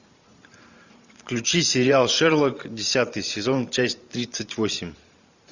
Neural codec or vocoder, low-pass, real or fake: vocoder, 44.1 kHz, 128 mel bands every 512 samples, BigVGAN v2; 7.2 kHz; fake